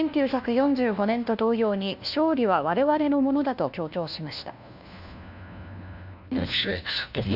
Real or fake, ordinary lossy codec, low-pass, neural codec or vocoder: fake; none; 5.4 kHz; codec, 16 kHz, 1 kbps, FunCodec, trained on LibriTTS, 50 frames a second